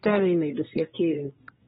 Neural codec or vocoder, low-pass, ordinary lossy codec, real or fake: codec, 24 kHz, 1 kbps, SNAC; 10.8 kHz; AAC, 16 kbps; fake